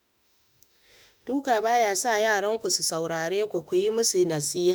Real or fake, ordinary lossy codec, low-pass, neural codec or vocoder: fake; none; none; autoencoder, 48 kHz, 32 numbers a frame, DAC-VAE, trained on Japanese speech